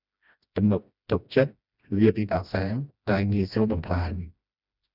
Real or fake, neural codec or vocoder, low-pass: fake; codec, 16 kHz, 1 kbps, FreqCodec, smaller model; 5.4 kHz